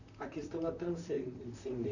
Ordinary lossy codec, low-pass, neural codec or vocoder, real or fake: none; 7.2 kHz; vocoder, 44.1 kHz, 128 mel bands, Pupu-Vocoder; fake